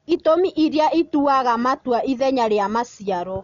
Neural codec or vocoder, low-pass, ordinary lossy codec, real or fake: none; 7.2 kHz; MP3, 96 kbps; real